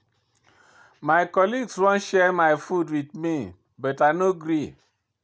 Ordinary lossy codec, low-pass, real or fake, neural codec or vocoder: none; none; real; none